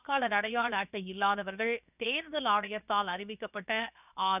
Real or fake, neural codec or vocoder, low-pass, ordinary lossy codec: fake; codec, 16 kHz, 0.7 kbps, FocalCodec; 3.6 kHz; none